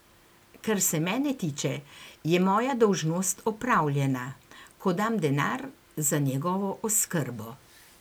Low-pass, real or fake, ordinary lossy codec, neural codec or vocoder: none; real; none; none